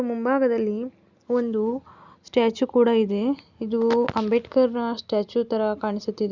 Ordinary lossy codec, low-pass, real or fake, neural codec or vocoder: Opus, 64 kbps; 7.2 kHz; real; none